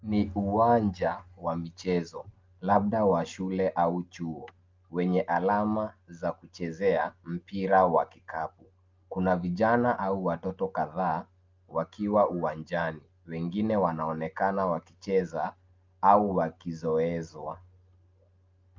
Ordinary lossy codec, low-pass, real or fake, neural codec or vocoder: Opus, 24 kbps; 7.2 kHz; real; none